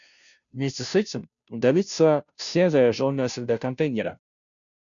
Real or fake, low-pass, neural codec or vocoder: fake; 7.2 kHz; codec, 16 kHz, 0.5 kbps, FunCodec, trained on Chinese and English, 25 frames a second